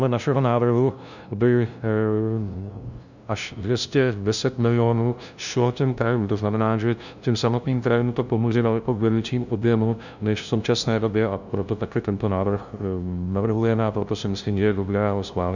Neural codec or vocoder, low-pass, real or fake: codec, 16 kHz, 0.5 kbps, FunCodec, trained on LibriTTS, 25 frames a second; 7.2 kHz; fake